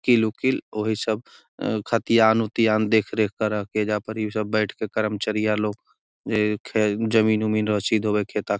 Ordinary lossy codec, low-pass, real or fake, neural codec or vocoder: none; none; real; none